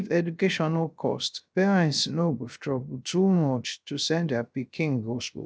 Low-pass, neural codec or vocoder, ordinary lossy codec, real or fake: none; codec, 16 kHz, 0.3 kbps, FocalCodec; none; fake